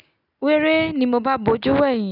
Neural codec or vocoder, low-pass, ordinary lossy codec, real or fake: none; 5.4 kHz; none; real